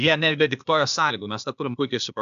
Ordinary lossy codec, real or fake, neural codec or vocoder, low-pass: MP3, 96 kbps; fake; codec, 16 kHz, 0.8 kbps, ZipCodec; 7.2 kHz